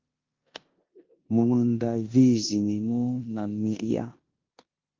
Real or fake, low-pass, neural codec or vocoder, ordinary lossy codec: fake; 7.2 kHz; codec, 16 kHz in and 24 kHz out, 0.9 kbps, LongCat-Audio-Codec, four codebook decoder; Opus, 24 kbps